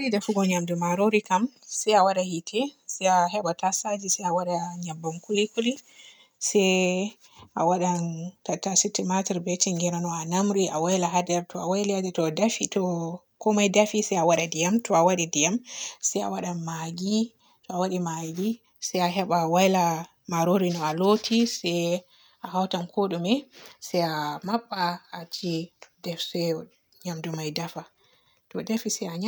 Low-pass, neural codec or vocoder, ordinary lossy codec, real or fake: none; none; none; real